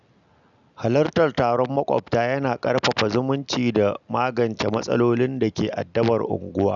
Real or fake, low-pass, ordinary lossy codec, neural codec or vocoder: real; 7.2 kHz; none; none